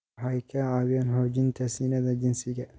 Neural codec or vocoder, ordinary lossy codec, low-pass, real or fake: none; none; none; real